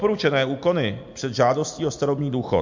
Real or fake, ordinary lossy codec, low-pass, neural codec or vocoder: real; MP3, 48 kbps; 7.2 kHz; none